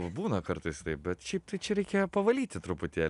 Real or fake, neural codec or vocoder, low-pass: real; none; 10.8 kHz